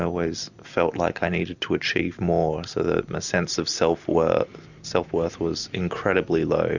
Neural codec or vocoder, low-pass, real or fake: none; 7.2 kHz; real